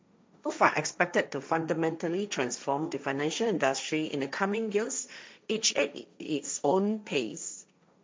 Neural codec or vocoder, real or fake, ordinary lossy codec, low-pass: codec, 16 kHz, 1.1 kbps, Voila-Tokenizer; fake; none; none